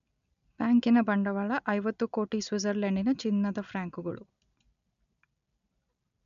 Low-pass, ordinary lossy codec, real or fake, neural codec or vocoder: 7.2 kHz; none; real; none